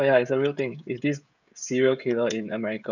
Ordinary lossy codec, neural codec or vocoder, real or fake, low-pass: none; codec, 16 kHz, 16 kbps, FreqCodec, smaller model; fake; 7.2 kHz